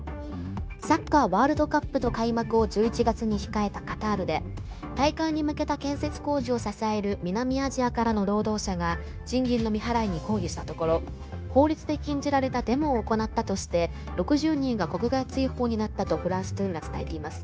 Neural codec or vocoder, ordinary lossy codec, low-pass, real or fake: codec, 16 kHz, 0.9 kbps, LongCat-Audio-Codec; none; none; fake